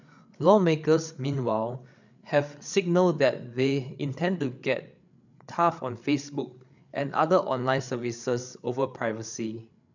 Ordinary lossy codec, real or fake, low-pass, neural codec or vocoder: none; fake; 7.2 kHz; codec, 16 kHz, 8 kbps, FreqCodec, larger model